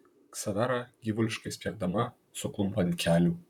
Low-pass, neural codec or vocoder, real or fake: 19.8 kHz; vocoder, 44.1 kHz, 128 mel bands, Pupu-Vocoder; fake